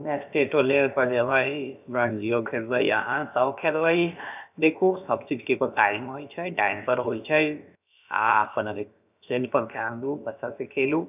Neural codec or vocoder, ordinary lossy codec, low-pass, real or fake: codec, 16 kHz, about 1 kbps, DyCAST, with the encoder's durations; none; 3.6 kHz; fake